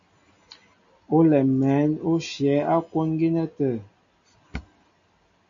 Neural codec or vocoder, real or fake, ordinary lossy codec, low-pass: none; real; MP3, 48 kbps; 7.2 kHz